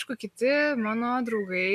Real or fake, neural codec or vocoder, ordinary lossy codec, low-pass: real; none; MP3, 96 kbps; 14.4 kHz